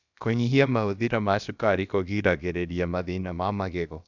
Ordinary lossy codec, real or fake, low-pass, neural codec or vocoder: none; fake; 7.2 kHz; codec, 16 kHz, about 1 kbps, DyCAST, with the encoder's durations